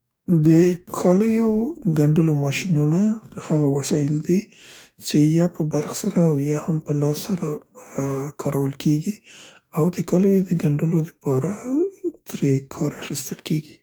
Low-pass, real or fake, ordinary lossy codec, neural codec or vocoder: none; fake; none; codec, 44.1 kHz, 2.6 kbps, DAC